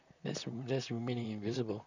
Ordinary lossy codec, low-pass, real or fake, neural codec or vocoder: none; 7.2 kHz; fake; vocoder, 44.1 kHz, 128 mel bands, Pupu-Vocoder